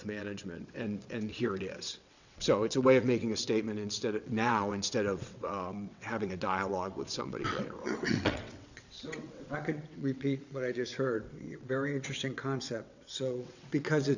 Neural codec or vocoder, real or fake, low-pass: vocoder, 22.05 kHz, 80 mel bands, WaveNeXt; fake; 7.2 kHz